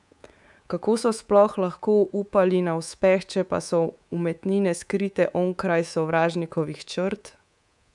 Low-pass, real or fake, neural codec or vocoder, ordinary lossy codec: 10.8 kHz; fake; codec, 24 kHz, 3.1 kbps, DualCodec; none